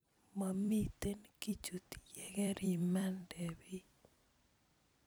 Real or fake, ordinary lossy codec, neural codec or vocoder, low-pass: fake; none; vocoder, 44.1 kHz, 128 mel bands every 256 samples, BigVGAN v2; none